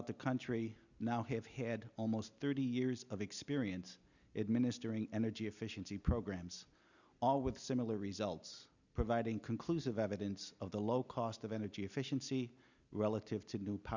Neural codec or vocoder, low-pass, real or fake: none; 7.2 kHz; real